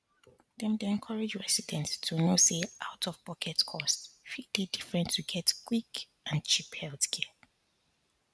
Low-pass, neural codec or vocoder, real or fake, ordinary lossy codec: none; none; real; none